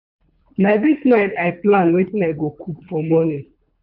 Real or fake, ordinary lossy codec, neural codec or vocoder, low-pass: fake; none; codec, 24 kHz, 3 kbps, HILCodec; 5.4 kHz